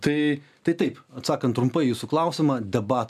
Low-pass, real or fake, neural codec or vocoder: 14.4 kHz; real; none